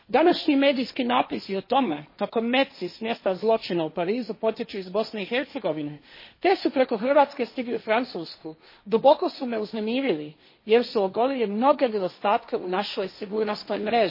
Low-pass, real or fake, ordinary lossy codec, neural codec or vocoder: 5.4 kHz; fake; MP3, 24 kbps; codec, 16 kHz, 1.1 kbps, Voila-Tokenizer